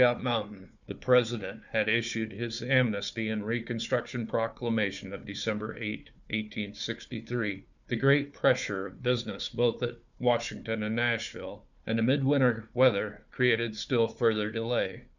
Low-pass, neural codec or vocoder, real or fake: 7.2 kHz; codec, 16 kHz, 4 kbps, FunCodec, trained on Chinese and English, 50 frames a second; fake